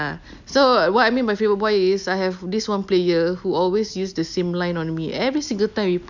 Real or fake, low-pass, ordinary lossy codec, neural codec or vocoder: real; 7.2 kHz; none; none